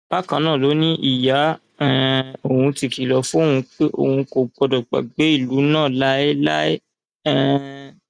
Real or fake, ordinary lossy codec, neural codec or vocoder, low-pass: real; none; none; 9.9 kHz